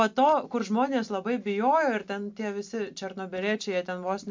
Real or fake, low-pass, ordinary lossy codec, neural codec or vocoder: real; 7.2 kHz; MP3, 64 kbps; none